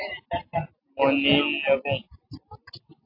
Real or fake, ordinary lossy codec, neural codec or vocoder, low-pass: real; AAC, 32 kbps; none; 5.4 kHz